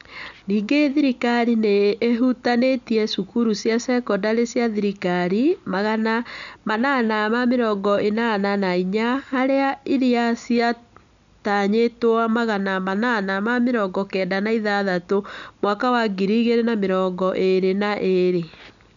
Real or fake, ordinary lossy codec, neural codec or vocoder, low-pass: real; none; none; 7.2 kHz